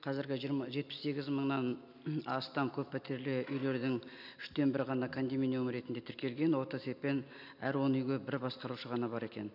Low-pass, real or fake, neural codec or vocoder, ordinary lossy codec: 5.4 kHz; real; none; none